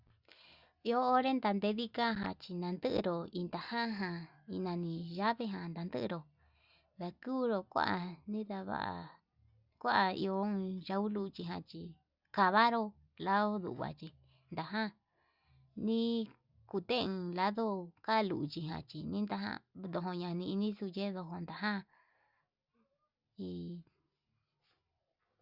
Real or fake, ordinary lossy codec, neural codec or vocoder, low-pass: real; Opus, 64 kbps; none; 5.4 kHz